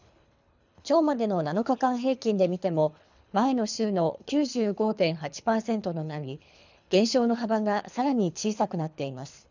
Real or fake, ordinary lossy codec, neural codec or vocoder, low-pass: fake; none; codec, 24 kHz, 3 kbps, HILCodec; 7.2 kHz